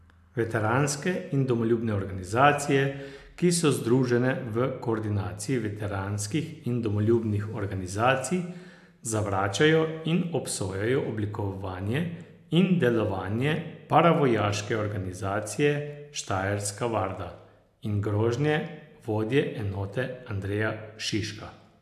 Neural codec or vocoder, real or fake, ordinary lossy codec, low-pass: none; real; AAC, 96 kbps; 14.4 kHz